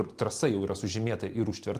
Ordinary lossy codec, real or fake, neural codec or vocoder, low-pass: Opus, 24 kbps; real; none; 14.4 kHz